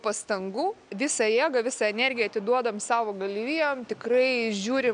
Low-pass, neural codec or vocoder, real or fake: 9.9 kHz; none; real